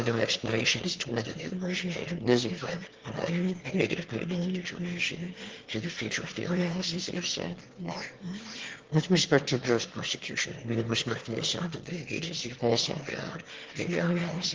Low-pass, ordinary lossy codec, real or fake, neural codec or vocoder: 7.2 kHz; Opus, 16 kbps; fake; autoencoder, 22.05 kHz, a latent of 192 numbers a frame, VITS, trained on one speaker